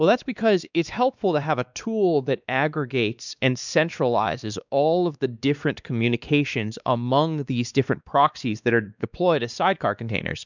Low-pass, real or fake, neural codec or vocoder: 7.2 kHz; fake; codec, 16 kHz, 2 kbps, X-Codec, WavLM features, trained on Multilingual LibriSpeech